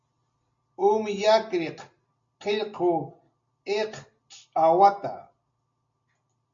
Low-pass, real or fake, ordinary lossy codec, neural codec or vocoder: 7.2 kHz; real; MP3, 48 kbps; none